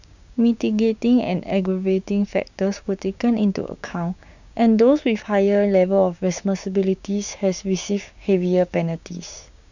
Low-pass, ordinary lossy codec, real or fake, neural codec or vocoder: 7.2 kHz; none; fake; codec, 16 kHz, 6 kbps, DAC